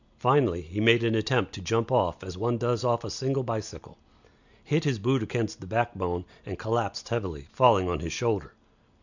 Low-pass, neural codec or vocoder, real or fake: 7.2 kHz; none; real